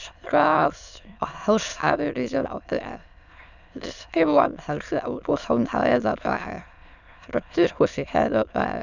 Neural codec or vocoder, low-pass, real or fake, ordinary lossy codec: autoencoder, 22.05 kHz, a latent of 192 numbers a frame, VITS, trained on many speakers; 7.2 kHz; fake; none